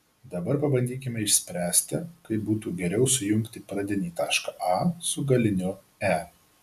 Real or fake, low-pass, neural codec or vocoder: real; 14.4 kHz; none